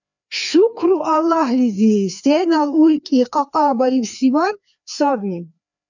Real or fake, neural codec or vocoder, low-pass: fake; codec, 16 kHz, 2 kbps, FreqCodec, larger model; 7.2 kHz